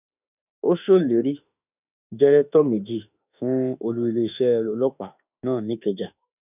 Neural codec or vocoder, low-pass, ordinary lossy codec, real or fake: autoencoder, 48 kHz, 32 numbers a frame, DAC-VAE, trained on Japanese speech; 3.6 kHz; none; fake